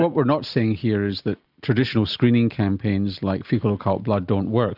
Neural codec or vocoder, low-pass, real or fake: none; 5.4 kHz; real